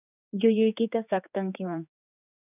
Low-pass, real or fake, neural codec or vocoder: 3.6 kHz; fake; codec, 16 kHz, 4 kbps, X-Codec, HuBERT features, trained on general audio